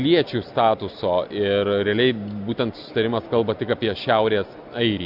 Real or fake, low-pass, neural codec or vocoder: real; 5.4 kHz; none